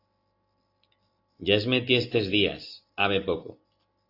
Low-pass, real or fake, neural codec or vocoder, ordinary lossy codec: 5.4 kHz; real; none; AAC, 32 kbps